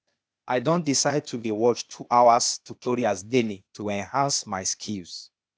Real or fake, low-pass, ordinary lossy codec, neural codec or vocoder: fake; none; none; codec, 16 kHz, 0.8 kbps, ZipCodec